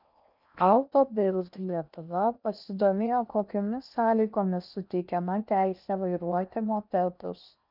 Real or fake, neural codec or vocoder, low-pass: fake; codec, 16 kHz in and 24 kHz out, 0.8 kbps, FocalCodec, streaming, 65536 codes; 5.4 kHz